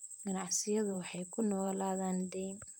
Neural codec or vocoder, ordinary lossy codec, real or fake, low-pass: vocoder, 44.1 kHz, 128 mel bands, Pupu-Vocoder; none; fake; 19.8 kHz